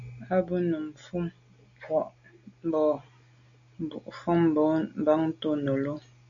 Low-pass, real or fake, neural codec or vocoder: 7.2 kHz; real; none